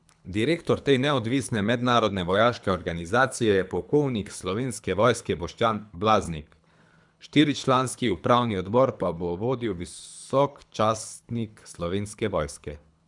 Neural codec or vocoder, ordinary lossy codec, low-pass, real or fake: codec, 24 kHz, 3 kbps, HILCodec; none; 10.8 kHz; fake